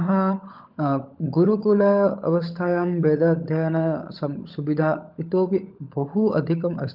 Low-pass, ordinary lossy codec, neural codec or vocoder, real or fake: 5.4 kHz; Opus, 32 kbps; codec, 16 kHz, 16 kbps, FunCodec, trained on LibriTTS, 50 frames a second; fake